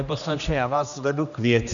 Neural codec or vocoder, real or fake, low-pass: codec, 16 kHz, 1 kbps, X-Codec, HuBERT features, trained on general audio; fake; 7.2 kHz